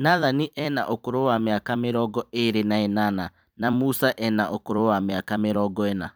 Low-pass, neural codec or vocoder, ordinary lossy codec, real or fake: none; vocoder, 44.1 kHz, 128 mel bands every 256 samples, BigVGAN v2; none; fake